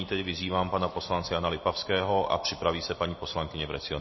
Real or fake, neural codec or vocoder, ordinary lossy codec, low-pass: real; none; MP3, 24 kbps; 7.2 kHz